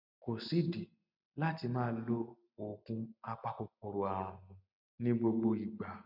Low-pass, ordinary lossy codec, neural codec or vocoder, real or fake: 5.4 kHz; none; none; real